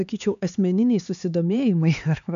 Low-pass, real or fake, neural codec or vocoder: 7.2 kHz; fake; codec, 16 kHz, 4 kbps, X-Codec, HuBERT features, trained on LibriSpeech